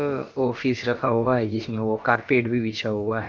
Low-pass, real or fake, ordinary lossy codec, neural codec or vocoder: 7.2 kHz; fake; Opus, 24 kbps; codec, 16 kHz, about 1 kbps, DyCAST, with the encoder's durations